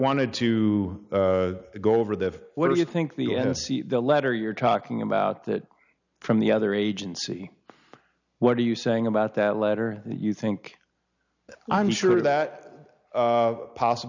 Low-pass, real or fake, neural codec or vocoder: 7.2 kHz; real; none